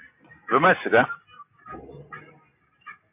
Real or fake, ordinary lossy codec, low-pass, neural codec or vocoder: real; MP3, 32 kbps; 3.6 kHz; none